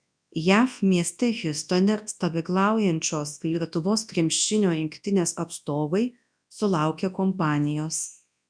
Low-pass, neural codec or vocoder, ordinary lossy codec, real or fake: 9.9 kHz; codec, 24 kHz, 0.9 kbps, WavTokenizer, large speech release; MP3, 96 kbps; fake